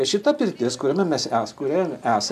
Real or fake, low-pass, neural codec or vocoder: real; 14.4 kHz; none